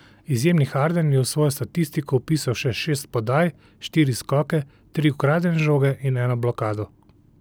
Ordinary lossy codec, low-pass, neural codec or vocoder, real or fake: none; none; none; real